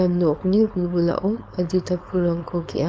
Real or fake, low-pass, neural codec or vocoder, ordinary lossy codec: fake; none; codec, 16 kHz, 4.8 kbps, FACodec; none